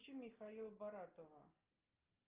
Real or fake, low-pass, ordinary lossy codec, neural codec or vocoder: real; 3.6 kHz; Opus, 24 kbps; none